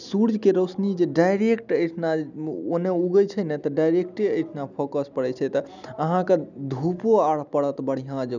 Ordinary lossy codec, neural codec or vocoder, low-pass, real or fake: none; none; 7.2 kHz; real